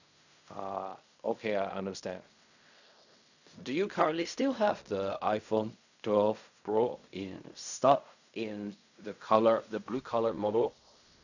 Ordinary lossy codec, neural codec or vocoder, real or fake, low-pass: none; codec, 16 kHz in and 24 kHz out, 0.4 kbps, LongCat-Audio-Codec, fine tuned four codebook decoder; fake; 7.2 kHz